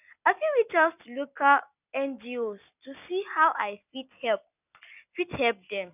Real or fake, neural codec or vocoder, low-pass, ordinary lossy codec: real; none; 3.6 kHz; none